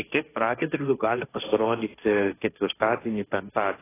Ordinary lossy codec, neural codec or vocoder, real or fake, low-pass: AAC, 16 kbps; codec, 16 kHz, 1.1 kbps, Voila-Tokenizer; fake; 3.6 kHz